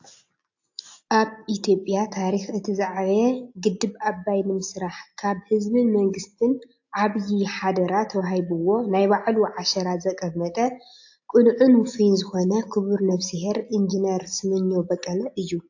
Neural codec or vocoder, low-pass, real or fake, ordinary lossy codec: none; 7.2 kHz; real; AAC, 48 kbps